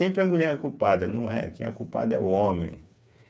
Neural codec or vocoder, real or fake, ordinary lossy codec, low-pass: codec, 16 kHz, 4 kbps, FreqCodec, smaller model; fake; none; none